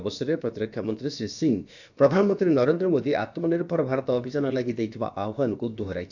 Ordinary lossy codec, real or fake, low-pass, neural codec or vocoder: none; fake; 7.2 kHz; codec, 16 kHz, about 1 kbps, DyCAST, with the encoder's durations